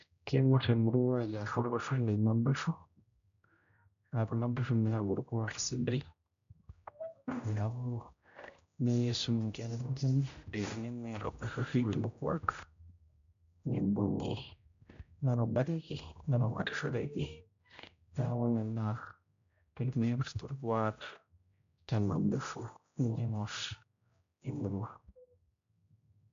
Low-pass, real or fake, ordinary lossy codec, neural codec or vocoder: 7.2 kHz; fake; MP3, 64 kbps; codec, 16 kHz, 0.5 kbps, X-Codec, HuBERT features, trained on general audio